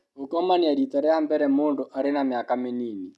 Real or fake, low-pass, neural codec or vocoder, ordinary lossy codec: real; none; none; none